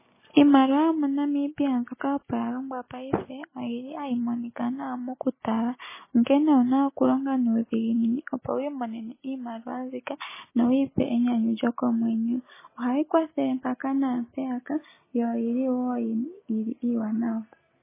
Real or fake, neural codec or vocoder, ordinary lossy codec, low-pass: real; none; MP3, 16 kbps; 3.6 kHz